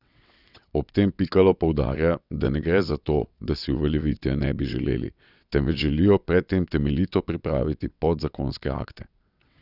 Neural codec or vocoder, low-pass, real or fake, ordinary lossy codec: vocoder, 22.05 kHz, 80 mel bands, WaveNeXt; 5.4 kHz; fake; none